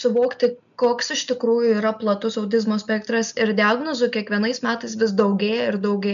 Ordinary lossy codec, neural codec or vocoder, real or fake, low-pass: MP3, 96 kbps; none; real; 7.2 kHz